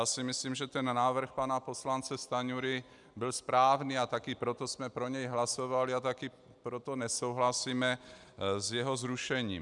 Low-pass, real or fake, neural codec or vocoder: 10.8 kHz; real; none